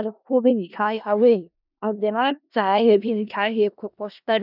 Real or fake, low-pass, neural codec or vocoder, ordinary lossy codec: fake; 5.4 kHz; codec, 16 kHz in and 24 kHz out, 0.4 kbps, LongCat-Audio-Codec, four codebook decoder; none